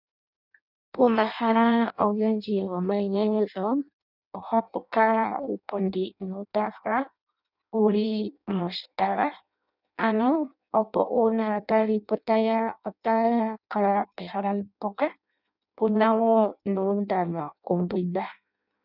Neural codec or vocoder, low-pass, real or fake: codec, 16 kHz in and 24 kHz out, 0.6 kbps, FireRedTTS-2 codec; 5.4 kHz; fake